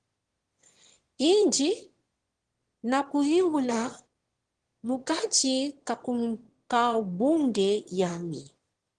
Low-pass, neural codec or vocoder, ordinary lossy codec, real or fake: 9.9 kHz; autoencoder, 22.05 kHz, a latent of 192 numbers a frame, VITS, trained on one speaker; Opus, 16 kbps; fake